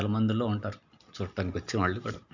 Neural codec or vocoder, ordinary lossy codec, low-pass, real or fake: none; none; 7.2 kHz; real